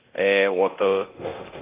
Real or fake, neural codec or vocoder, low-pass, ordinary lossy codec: fake; codec, 24 kHz, 0.9 kbps, DualCodec; 3.6 kHz; Opus, 24 kbps